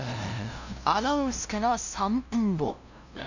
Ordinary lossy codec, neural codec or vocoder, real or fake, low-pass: none; codec, 16 kHz, 0.5 kbps, FunCodec, trained on LibriTTS, 25 frames a second; fake; 7.2 kHz